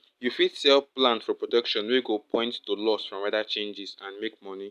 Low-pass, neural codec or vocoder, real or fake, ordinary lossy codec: 14.4 kHz; none; real; none